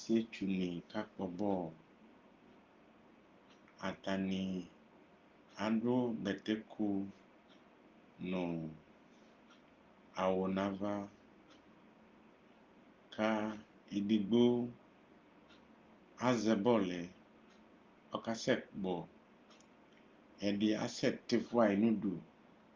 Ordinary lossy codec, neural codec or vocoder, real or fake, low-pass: Opus, 24 kbps; none; real; 7.2 kHz